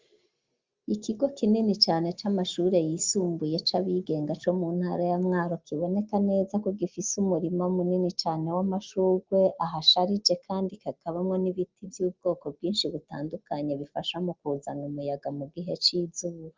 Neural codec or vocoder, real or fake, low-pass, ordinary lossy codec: none; real; 7.2 kHz; Opus, 32 kbps